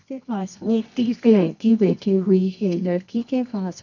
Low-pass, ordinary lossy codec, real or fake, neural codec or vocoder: 7.2 kHz; none; fake; codec, 24 kHz, 0.9 kbps, WavTokenizer, medium music audio release